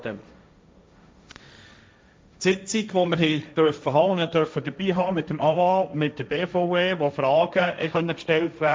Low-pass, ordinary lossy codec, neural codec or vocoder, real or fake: none; none; codec, 16 kHz, 1.1 kbps, Voila-Tokenizer; fake